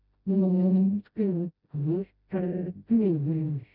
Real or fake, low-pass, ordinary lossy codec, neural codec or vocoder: fake; 5.4 kHz; none; codec, 16 kHz, 0.5 kbps, FreqCodec, smaller model